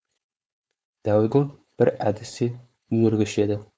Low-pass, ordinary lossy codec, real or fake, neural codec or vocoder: none; none; fake; codec, 16 kHz, 4.8 kbps, FACodec